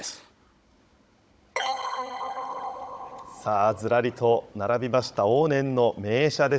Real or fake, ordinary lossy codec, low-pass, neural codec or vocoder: fake; none; none; codec, 16 kHz, 16 kbps, FunCodec, trained on Chinese and English, 50 frames a second